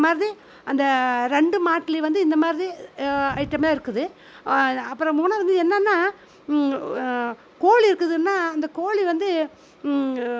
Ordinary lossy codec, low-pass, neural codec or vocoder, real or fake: none; none; none; real